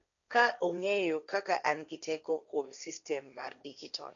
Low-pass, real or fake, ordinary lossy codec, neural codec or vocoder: 7.2 kHz; fake; none; codec, 16 kHz, 1.1 kbps, Voila-Tokenizer